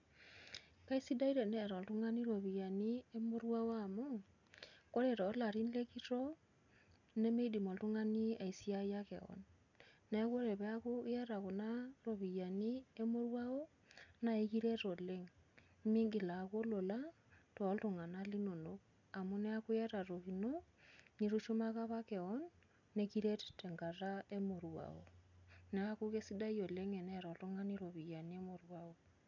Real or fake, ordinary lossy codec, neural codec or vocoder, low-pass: real; none; none; 7.2 kHz